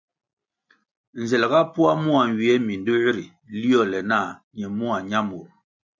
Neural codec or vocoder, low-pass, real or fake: none; 7.2 kHz; real